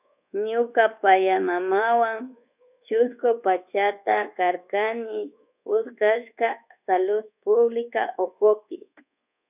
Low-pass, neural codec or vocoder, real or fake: 3.6 kHz; codec, 24 kHz, 1.2 kbps, DualCodec; fake